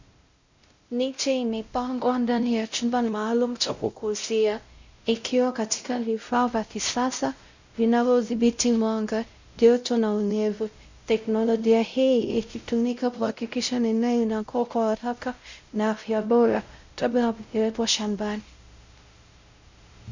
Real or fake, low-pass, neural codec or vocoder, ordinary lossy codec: fake; 7.2 kHz; codec, 16 kHz, 0.5 kbps, X-Codec, WavLM features, trained on Multilingual LibriSpeech; Opus, 64 kbps